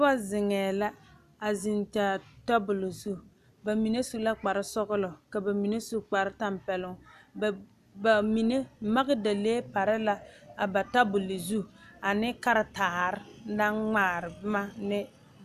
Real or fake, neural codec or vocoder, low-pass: real; none; 14.4 kHz